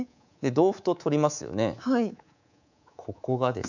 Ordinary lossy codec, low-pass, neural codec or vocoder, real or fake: none; 7.2 kHz; codec, 24 kHz, 3.1 kbps, DualCodec; fake